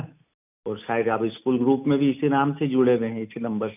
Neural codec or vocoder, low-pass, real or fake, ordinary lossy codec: none; 3.6 kHz; real; none